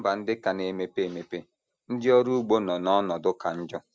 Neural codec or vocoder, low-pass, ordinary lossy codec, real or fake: none; none; none; real